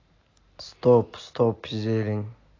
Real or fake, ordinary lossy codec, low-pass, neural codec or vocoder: real; AAC, 32 kbps; 7.2 kHz; none